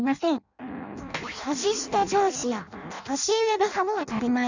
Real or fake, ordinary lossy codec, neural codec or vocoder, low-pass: fake; none; codec, 16 kHz in and 24 kHz out, 0.6 kbps, FireRedTTS-2 codec; 7.2 kHz